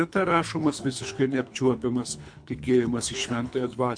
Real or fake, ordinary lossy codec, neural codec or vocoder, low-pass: fake; MP3, 64 kbps; codec, 24 kHz, 3 kbps, HILCodec; 9.9 kHz